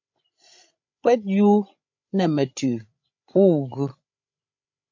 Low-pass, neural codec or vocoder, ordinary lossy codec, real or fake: 7.2 kHz; codec, 16 kHz, 16 kbps, FreqCodec, larger model; MP3, 48 kbps; fake